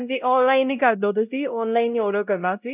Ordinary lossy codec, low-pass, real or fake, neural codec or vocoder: none; 3.6 kHz; fake; codec, 16 kHz, 0.5 kbps, X-Codec, WavLM features, trained on Multilingual LibriSpeech